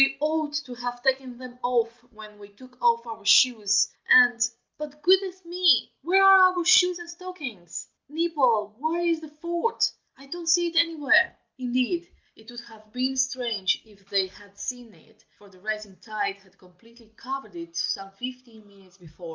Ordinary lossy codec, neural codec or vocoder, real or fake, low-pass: Opus, 32 kbps; none; real; 7.2 kHz